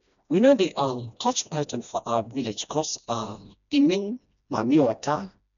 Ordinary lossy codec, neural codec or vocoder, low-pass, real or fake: none; codec, 16 kHz, 1 kbps, FreqCodec, smaller model; 7.2 kHz; fake